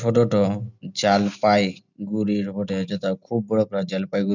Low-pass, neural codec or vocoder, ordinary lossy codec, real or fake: 7.2 kHz; none; none; real